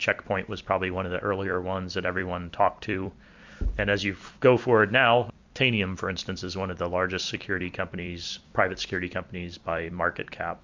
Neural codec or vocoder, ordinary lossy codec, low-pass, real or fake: vocoder, 44.1 kHz, 128 mel bands every 256 samples, BigVGAN v2; MP3, 64 kbps; 7.2 kHz; fake